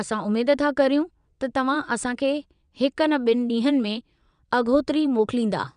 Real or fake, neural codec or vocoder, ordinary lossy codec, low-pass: fake; vocoder, 22.05 kHz, 80 mel bands, WaveNeXt; none; 9.9 kHz